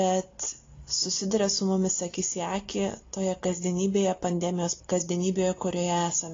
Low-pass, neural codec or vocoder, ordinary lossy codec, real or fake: 7.2 kHz; none; AAC, 32 kbps; real